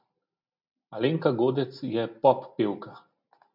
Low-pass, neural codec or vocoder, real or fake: 5.4 kHz; none; real